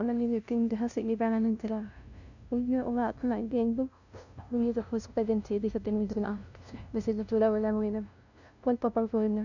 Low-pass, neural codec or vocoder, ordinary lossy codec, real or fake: 7.2 kHz; codec, 16 kHz, 0.5 kbps, FunCodec, trained on LibriTTS, 25 frames a second; none; fake